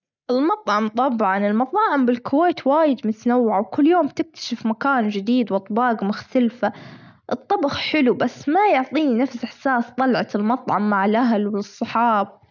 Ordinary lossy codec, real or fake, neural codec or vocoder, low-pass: none; real; none; 7.2 kHz